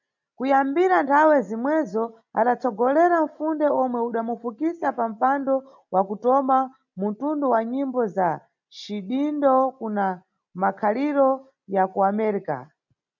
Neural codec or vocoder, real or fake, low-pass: none; real; 7.2 kHz